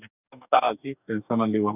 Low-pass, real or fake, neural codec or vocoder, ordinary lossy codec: 3.6 kHz; fake; codec, 44.1 kHz, 2.6 kbps, DAC; none